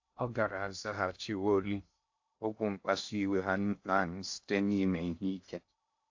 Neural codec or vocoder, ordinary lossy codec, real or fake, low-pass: codec, 16 kHz in and 24 kHz out, 0.6 kbps, FocalCodec, streaming, 2048 codes; AAC, 48 kbps; fake; 7.2 kHz